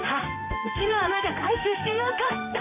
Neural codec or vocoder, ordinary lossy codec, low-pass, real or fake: codec, 16 kHz in and 24 kHz out, 1 kbps, XY-Tokenizer; MP3, 24 kbps; 3.6 kHz; fake